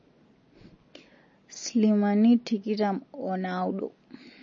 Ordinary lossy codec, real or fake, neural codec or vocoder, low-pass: MP3, 32 kbps; real; none; 7.2 kHz